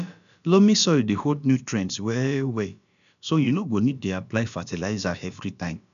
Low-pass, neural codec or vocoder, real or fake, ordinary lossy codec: 7.2 kHz; codec, 16 kHz, about 1 kbps, DyCAST, with the encoder's durations; fake; none